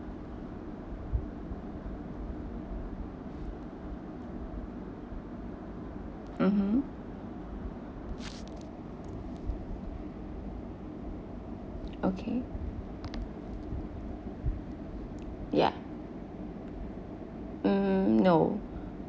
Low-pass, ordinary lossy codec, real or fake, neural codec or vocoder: none; none; real; none